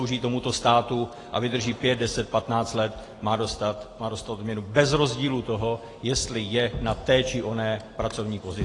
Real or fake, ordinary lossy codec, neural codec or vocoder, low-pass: real; AAC, 32 kbps; none; 10.8 kHz